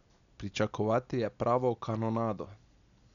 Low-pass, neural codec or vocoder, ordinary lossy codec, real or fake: 7.2 kHz; none; none; real